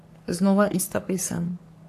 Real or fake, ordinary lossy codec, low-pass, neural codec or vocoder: fake; AAC, 64 kbps; 14.4 kHz; codec, 44.1 kHz, 3.4 kbps, Pupu-Codec